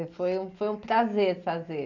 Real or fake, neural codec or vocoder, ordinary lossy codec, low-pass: real; none; none; 7.2 kHz